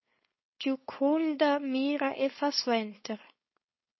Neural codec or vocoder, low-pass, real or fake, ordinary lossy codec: codec, 16 kHz, 4.8 kbps, FACodec; 7.2 kHz; fake; MP3, 24 kbps